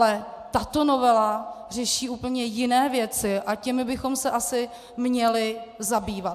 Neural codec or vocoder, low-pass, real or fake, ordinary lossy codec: none; 14.4 kHz; real; AAC, 96 kbps